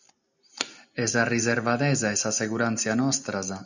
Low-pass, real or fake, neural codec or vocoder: 7.2 kHz; real; none